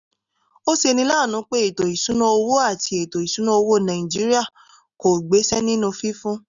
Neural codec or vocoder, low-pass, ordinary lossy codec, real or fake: none; 7.2 kHz; none; real